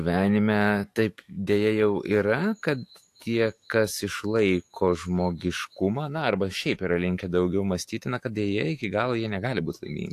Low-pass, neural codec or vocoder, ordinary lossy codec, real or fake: 14.4 kHz; autoencoder, 48 kHz, 128 numbers a frame, DAC-VAE, trained on Japanese speech; AAC, 64 kbps; fake